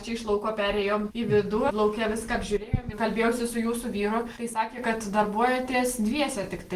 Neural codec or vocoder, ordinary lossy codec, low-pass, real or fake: none; Opus, 24 kbps; 14.4 kHz; real